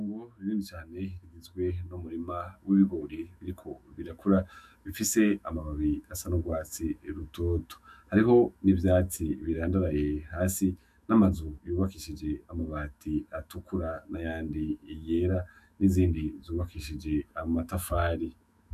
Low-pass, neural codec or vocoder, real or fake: 14.4 kHz; autoencoder, 48 kHz, 128 numbers a frame, DAC-VAE, trained on Japanese speech; fake